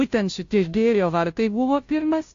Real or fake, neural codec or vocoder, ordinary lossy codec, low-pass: fake; codec, 16 kHz, 0.5 kbps, FunCodec, trained on Chinese and English, 25 frames a second; AAC, 48 kbps; 7.2 kHz